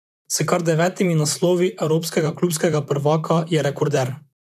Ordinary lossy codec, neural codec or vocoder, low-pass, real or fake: none; vocoder, 44.1 kHz, 128 mel bands, Pupu-Vocoder; 14.4 kHz; fake